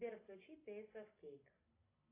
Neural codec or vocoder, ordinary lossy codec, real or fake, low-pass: none; AAC, 24 kbps; real; 3.6 kHz